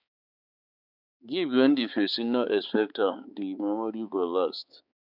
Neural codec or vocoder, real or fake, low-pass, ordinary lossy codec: codec, 16 kHz, 4 kbps, X-Codec, HuBERT features, trained on balanced general audio; fake; 5.4 kHz; none